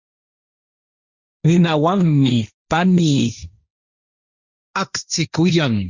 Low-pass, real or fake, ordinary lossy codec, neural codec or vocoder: 7.2 kHz; fake; Opus, 64 kbps; codec, 16 kHz, 1.1 kbps, Voila-Tokenizer